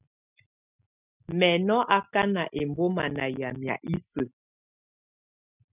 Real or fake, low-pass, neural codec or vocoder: real; 3.6 kHz; none